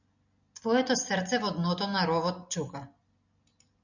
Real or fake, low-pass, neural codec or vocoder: real; 7.2 kHz; none